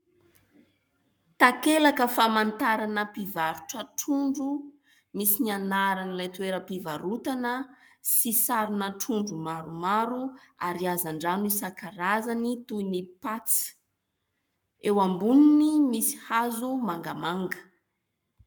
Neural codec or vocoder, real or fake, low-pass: codec, 44.1 kHz, 7.8 kbps, Pupu-Codec; fake; 19.8 kHz